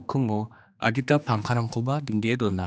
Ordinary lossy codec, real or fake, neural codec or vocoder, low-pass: none; fake; codec, 16 kHz, 2 kbps, X-Codec, HuBERT features, trained on general audio; none